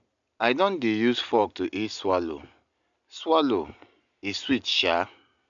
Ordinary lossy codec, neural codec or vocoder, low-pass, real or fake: none; none; 7.2 kHz; real